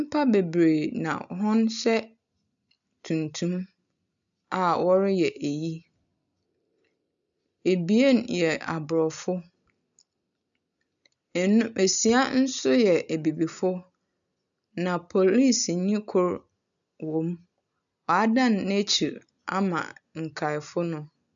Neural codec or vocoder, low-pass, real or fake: none; 7.2 kHz; real